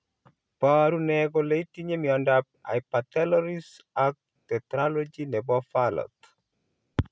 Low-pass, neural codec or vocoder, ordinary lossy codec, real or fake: none; none; none; real